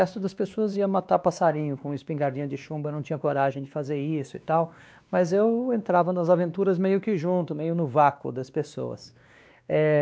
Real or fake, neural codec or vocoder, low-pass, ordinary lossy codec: fake; codec, 16 kHz, 2 kbps, X-Codec, WavLM features, trained on Multilingual LibriSpeech; none; none